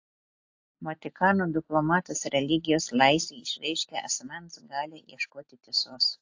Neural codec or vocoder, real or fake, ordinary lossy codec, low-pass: none; real; AAC, 48 kbps; 7.2 kHz